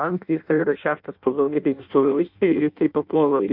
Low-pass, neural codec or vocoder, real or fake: 5.4 kHz; codec, 16 kHz in and 24 kHz out, 0.6 kbps, FireRedTTS-2 codec; fake